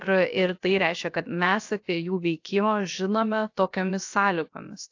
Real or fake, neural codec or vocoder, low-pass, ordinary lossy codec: fake; codec, 16 kHz, about 1 kbps, DyCAST, with the encoder's durations; 7.2 kHz; AAC, 48 kbps